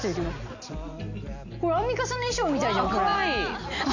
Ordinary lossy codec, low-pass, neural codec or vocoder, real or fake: none; 7.2 kHz; none; real